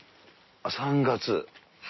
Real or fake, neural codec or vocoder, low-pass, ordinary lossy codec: real; none; 7.2 kHz; MP3, 24 kbps